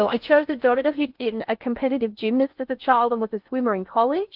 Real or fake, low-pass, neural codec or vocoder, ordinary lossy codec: fake; 5.4 kHz; codec, 16 kHz in and 24 kHz out, 0.6 kbps, FocalCodec, streaming, 2048 codes; Opus, 24 kbps